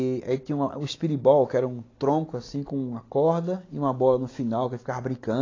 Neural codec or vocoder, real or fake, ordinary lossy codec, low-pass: none; real; AAC, 32 kbps; 7.2 kHz